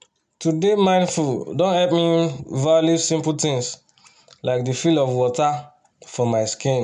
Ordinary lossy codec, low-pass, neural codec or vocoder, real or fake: none; 9.9 kHz; none; real